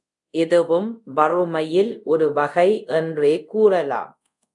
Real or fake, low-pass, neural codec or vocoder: fake; 10.8 kHz; codec, 24 kHz, 0.5 kbps, DualCodec